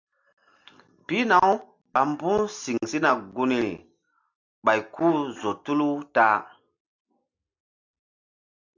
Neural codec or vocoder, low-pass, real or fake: none; 7.2 kHz; real